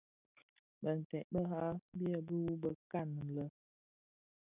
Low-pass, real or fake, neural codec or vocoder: 3.6 kHz; real; none